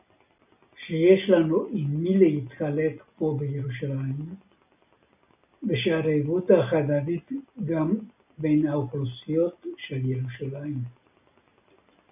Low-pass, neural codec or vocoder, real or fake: 3.6 kHz; none; real